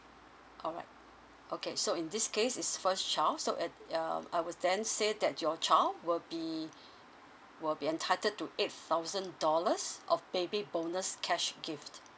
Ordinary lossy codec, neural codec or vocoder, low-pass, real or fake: none; none; none; real